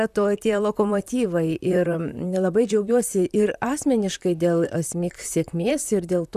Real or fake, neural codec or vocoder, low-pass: fake; vocoder, 44.1 kHz, 128 mel bands, Pupu-Vocoder; 14.4 kHz